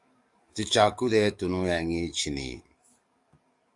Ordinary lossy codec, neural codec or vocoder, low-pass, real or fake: Opus, 64 kbps; codec, 44.1 kHz, 7.8 kbps, DAC; 10.8 kHz; fake